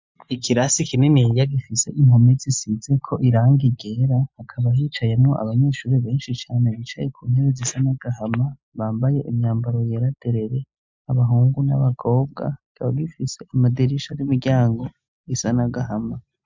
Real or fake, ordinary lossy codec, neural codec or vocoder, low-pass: real; MP3, 64 kbps; none; 7.2 kHz